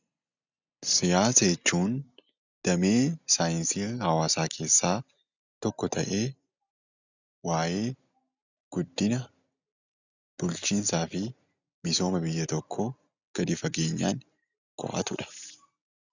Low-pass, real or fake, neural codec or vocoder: 7.2 kHz; real; none